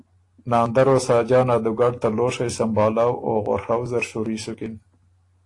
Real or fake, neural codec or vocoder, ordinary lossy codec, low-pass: real; none; AAC, 48 kbps; 10.8 kHz